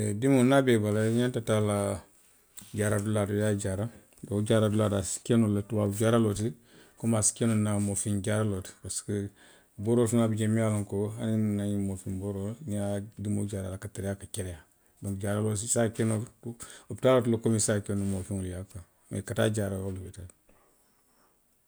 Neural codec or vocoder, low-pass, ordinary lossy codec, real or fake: none; none; none; real